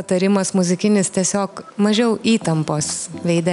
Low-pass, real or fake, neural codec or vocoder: 10.8 kHz; real; none